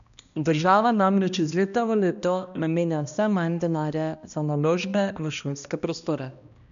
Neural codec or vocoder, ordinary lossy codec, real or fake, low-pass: codec, 16 kHz, 1 kbps, X-Codec, HuBERT features, trained on balanced general audio; none; fake; 7.2 kHz